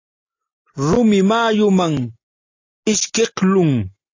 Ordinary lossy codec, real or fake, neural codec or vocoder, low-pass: AAC, 32 kbps; real; none; 7.2 kHz